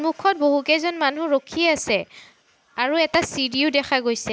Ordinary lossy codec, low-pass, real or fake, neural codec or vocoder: none; none; real; none